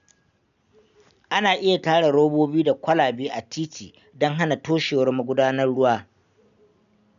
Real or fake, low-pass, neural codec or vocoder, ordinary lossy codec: real; 7.2 kHz; none; none